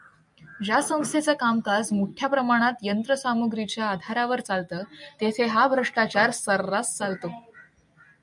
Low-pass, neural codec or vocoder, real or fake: 10.8 kHz; none; real